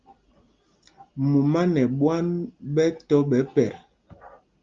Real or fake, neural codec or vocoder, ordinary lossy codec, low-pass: real; none; Opus, 32 kbps; 7.2 kHz